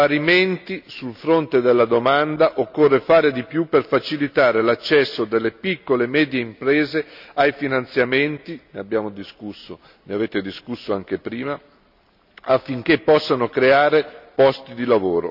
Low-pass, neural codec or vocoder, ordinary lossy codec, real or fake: 5.4 kHz; none; none; real